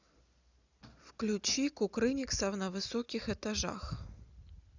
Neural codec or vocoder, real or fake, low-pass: none; real; 7.2 kHz